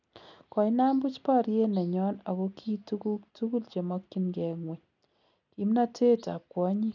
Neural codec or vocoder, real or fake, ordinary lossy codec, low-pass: none; real; none; 7.2 kHz